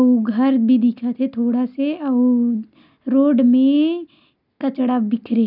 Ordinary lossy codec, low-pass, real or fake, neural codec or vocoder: none; 5.4 kHz; real; none